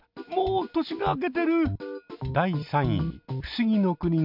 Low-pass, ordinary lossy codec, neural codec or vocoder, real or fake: 5.4 kHz; MP3, 48 kbps; none; real